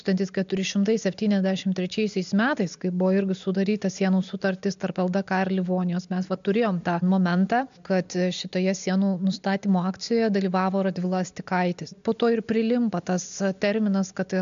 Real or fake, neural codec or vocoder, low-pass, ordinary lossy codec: real; none; 7.2 kHz; MP3, 64 kbps